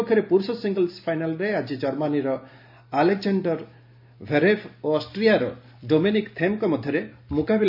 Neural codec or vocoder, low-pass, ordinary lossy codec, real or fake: none; 5.4 kHz; MP3, 48 kbps; real